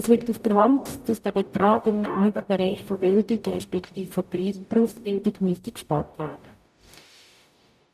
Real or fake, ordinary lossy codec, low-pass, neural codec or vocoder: fake; none; 14.4 kHz; codec, 44.1 kHz, 0.9 kbps, DAC